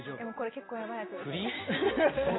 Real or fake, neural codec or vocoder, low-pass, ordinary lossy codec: real; none; 7.2 kHz; AAC, 16 kbps